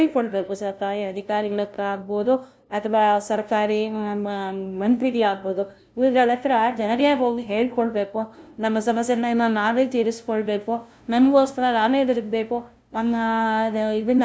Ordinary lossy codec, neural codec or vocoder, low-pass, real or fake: none; codec, 16 kHz, 0.5 kbps, FunCodec, trained on LibriTTS, 25 frames a second; none; fake